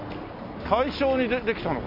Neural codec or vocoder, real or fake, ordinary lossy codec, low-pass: none; real; AAC, 48 kbps; 5.4 kHz